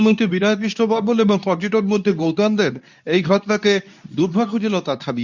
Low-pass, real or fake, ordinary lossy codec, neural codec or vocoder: 7.2 kHz; fake; none; codec, 24 kHz, 0.9 kbps, WavTokenizer, medium speech release version 2